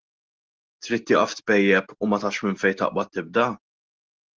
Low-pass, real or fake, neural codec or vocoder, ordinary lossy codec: 7.2 kHz; real; none; Opus, 16 kbps